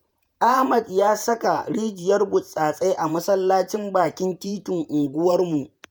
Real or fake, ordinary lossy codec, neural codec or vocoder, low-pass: fake; none; vocoder, 48 kHz, 128 mel bands, Vocos; none